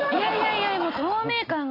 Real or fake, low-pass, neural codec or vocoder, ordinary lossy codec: real; 5.4 kHz; none; AAC, 48 kbps